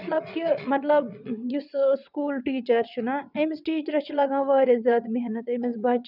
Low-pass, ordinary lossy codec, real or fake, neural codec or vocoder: 5.4 kHz; none; fake; vocoder, 22.05 kHz, 80 mel bands, WaveNeXt